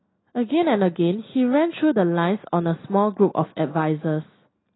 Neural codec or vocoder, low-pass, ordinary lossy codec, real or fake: none; 7.2 kHz; AAC, 16 kbps; real